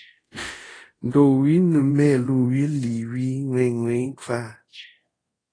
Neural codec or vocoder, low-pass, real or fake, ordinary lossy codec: codec, 24 kHz, 0.5 kbps, DualCodec; 9.9 kHz; fake; AAC, 32 kbps